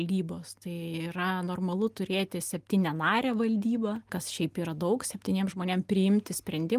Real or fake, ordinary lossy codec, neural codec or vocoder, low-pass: real; Opus, 32 kbps; none; 14.4 kHz